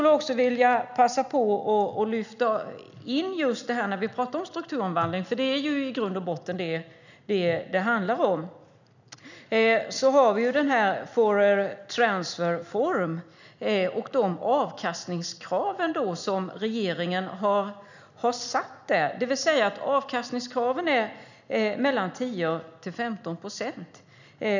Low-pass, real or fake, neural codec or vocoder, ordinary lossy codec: 7.2 kHz; real; none; none